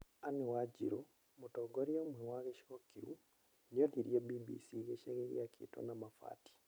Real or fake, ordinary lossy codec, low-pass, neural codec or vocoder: fake; none; none; vocoder, 44.1 kHz, 128 mel bands every 256 samples, BigVGAN v2